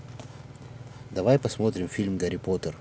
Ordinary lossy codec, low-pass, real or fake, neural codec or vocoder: none; none; real; none